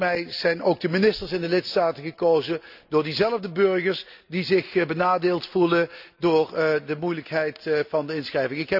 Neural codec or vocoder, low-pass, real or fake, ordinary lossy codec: none; 5.4 kHz; real; none